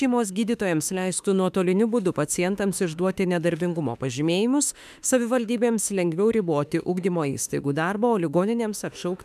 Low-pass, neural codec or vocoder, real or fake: 14.4 kHz; autoencoder, 48 kHz, 32 numbers a frame, DAC-VAE, trained on Japanese speech; fake